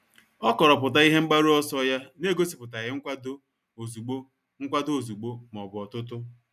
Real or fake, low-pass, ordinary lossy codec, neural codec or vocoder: real; 14.4 kHz; none; none